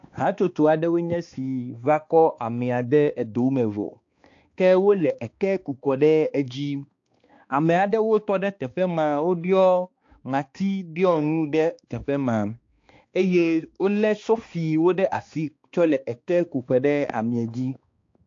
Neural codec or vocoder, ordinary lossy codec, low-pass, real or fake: codec, 16 kHz, 2 kbps, X-Codec, HuBERT features, trained on balanced general audio; AAC, 48 kbps; 7.2 kHz; fake